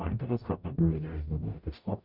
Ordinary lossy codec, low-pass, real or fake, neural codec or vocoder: Opus, 64 kbps; 5.4 kHz; fake; codec, 44.1 kHz, 0.9 kbps, DAC